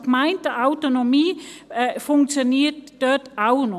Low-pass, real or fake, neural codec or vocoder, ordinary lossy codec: 14.4 kHz; real; none; none